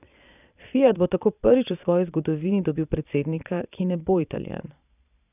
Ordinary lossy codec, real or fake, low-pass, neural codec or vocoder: none; fake; 3.6 kHz; vocoder, 22.05 kHz, 80 mel bands, Vocos